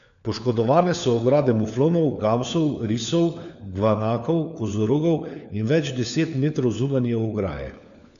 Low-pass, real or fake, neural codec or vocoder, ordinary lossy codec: 7.2 kHz; fake; codec, 16 kHz, 4 kbps, FunCodec, trained on LibriTTS, 50 frames a second; none